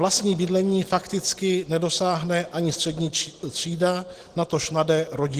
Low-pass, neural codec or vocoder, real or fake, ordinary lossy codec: 14.4 kHz; none; real; Opus, 16 kbps